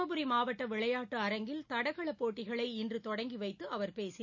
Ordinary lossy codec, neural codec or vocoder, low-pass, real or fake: none; none; 7.2 kHz; real